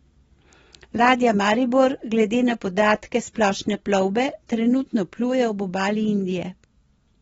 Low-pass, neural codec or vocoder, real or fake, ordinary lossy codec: 19.8 kHz; none; real; AAC, 24 kbps